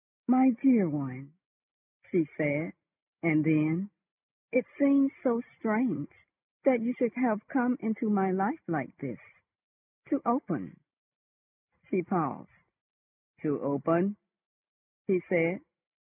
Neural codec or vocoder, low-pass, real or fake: none; 3.6 kHz; real